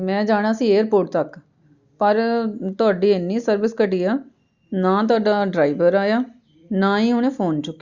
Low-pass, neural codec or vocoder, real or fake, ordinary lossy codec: 7.2 kHz; none; real; Opus, 64 kbps